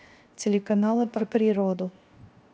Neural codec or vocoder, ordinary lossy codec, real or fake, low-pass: codec, 16 kHz, 0.7 kbps, FocalCodec; none; fake; none